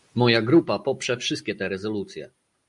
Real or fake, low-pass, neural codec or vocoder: real; 10.8 kHz; none